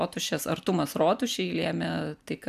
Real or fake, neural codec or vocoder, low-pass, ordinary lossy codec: real; none; 14.4 kHz; MP3, 96 kbps